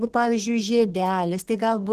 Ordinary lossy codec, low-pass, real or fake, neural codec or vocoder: Opus, 16 kbps; 14.4 kHz; fake; codec, 32 kHz, 1.9 kbps, SNAC